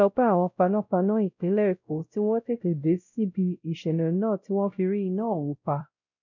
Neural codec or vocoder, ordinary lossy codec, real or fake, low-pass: codec, 16 kHz, 0.5 kbps, X-Codec, WavLM features, trained on Multilingual LibriSpeech; none; fake; 7.2 kHz